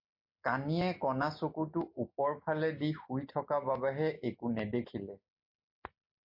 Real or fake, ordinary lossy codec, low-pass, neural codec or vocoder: real; MP3, 32 kbps; 5.4 kHz; none